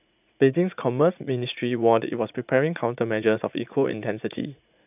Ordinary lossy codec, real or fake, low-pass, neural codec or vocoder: none; fake; 3.6 kHz; vocoder, 44.1 kHz, 80 mel bands, Vocos